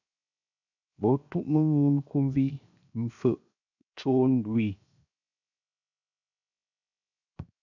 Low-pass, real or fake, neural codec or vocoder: 7.2 kHz; fake; codec, 16 kHz, 0.7 kbps, FocalCodec